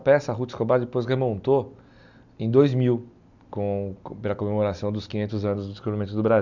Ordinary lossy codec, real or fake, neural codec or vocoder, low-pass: none; real; none; 7.2 kHz